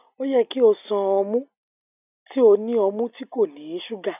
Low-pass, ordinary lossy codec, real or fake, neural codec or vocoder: 3.6 kHz; none; real; none